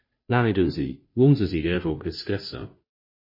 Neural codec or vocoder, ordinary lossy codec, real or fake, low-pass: codec, 16 kHz, 0.5 kbps, FunCodec, trained on Chinese and English, 25 frames a second; MP3, 24 kbps; fake; 5.4 kHz